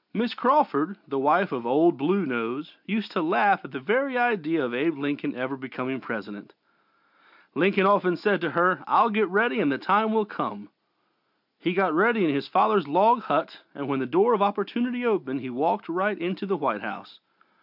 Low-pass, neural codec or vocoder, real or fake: 5.4 kHz; none; real